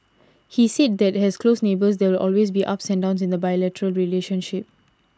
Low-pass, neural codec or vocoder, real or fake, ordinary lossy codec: none; none; real; none